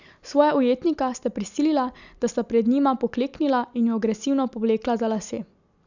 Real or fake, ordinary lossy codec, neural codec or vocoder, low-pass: real; none; none; 7.2 kHz